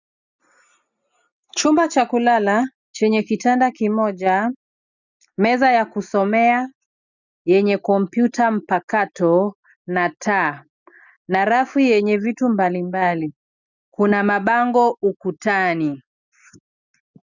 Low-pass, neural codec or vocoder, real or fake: 7.2 kHz; none; real